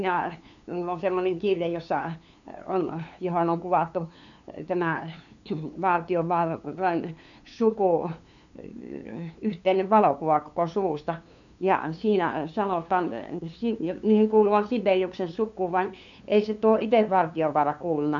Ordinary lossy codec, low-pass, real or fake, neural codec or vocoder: none; 7.2 kHz; fake; codec, 16 kHz, 2 kbps, FunCodec, trained on LibriTTS, 25 frames a second